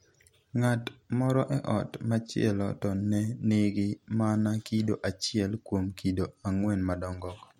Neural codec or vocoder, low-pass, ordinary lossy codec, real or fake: none; 19.8 kHz; MP3, 64 kbps; real